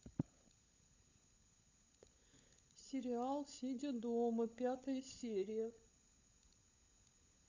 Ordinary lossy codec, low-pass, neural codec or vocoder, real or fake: none; 7.2 kHz; codec, 16 kHz, 16 kbps, FunCodec, trained on LibriTTS, 50 frames a second; fake